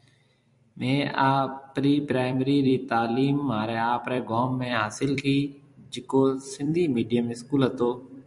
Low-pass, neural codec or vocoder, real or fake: 10.8 kHz; vocoder, 44.1 kHz, 128 mel bands every 256 samples, BigVGAN v2; fake